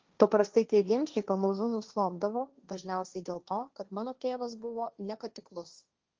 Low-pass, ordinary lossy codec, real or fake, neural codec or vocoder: 7.2 kHz; Opus, 32 kbps; fake; codec, 16 kHz, 1.1 kbps, Voila-Tokenizer